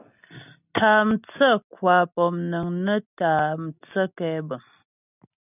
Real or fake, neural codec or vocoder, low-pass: real; none; 3.6 kHz